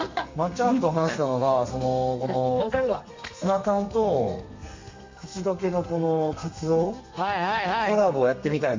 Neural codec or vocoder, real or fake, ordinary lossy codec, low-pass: codec, 32 kHz, 1.9 kbps, SNAC; fake; MP3, 48 kbps; 7.2 kHz